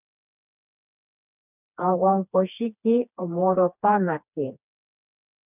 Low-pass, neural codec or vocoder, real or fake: 3.6 kHz; codec, 16 kHz, 2 kbps, FreqCodec, smaller model; fake